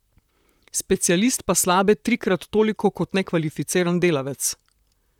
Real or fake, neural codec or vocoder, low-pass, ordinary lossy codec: fake; vocoder, 44.1 kHz, 128 mel bands, Pupu-Vocoder; 19.8 kHz; none